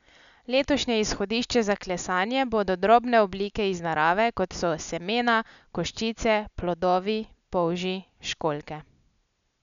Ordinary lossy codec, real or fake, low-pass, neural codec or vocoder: none; real; 7.2 kHz; none